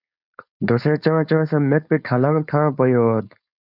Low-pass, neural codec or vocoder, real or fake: 5.4 kHz; codec, 16 kHz, 4.8 kbps, FACodec; fake